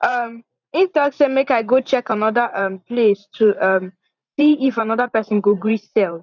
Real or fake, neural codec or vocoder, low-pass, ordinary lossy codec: fake; vocoder, 44.1 kHz, 128 mel bands every 512 samples, BigVGAN v2; 7.2 kHz; none